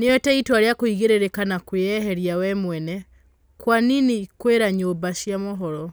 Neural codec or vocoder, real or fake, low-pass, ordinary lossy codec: none; real; none; none